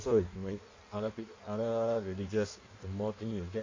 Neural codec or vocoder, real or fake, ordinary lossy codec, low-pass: codec, 16 kHz in and 24 kHz out, 1.1 kbps, FireRedTTS-2 codec; fake; MP3, 48 kbps; 7.2 kHz